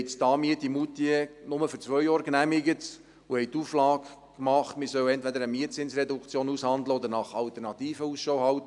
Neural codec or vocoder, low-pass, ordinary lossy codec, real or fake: none; 10.8 kHz; none; real